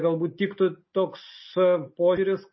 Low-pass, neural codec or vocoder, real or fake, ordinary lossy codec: 7.2 kHz; none; real; MP3, 24 kbps